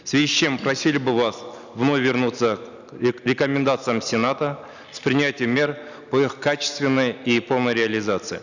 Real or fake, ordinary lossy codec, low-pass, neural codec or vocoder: real; none; 7.2 kHz; none